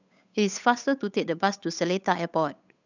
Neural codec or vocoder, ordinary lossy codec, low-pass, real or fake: codec, 16 kHz, 8 kbps, FunCodec, trained on Chinese and English, 25 frames a second; none; 7.2 kHz; fake